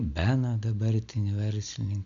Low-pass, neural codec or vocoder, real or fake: 7.2 kHz; none; real